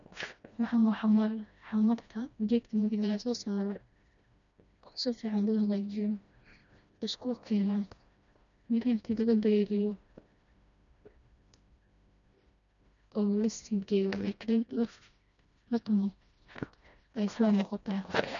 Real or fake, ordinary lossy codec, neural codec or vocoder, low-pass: fake; none; codec, 16 kHz, 1 kbps, FreqCodec, smaller model; 7.2 kHz